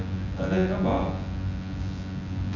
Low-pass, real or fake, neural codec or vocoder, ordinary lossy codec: 7.2 kHz; fake; vocoder, 24 kHz, 100 mel bands, Vocos; none